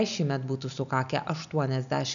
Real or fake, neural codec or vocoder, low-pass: real; none; 7.2 kHz